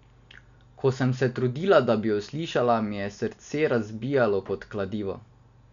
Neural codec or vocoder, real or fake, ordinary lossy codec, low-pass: none; real; none; 7.2 kHz